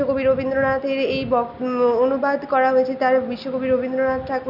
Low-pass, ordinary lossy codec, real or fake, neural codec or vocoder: 5.4 kHz; none; real; none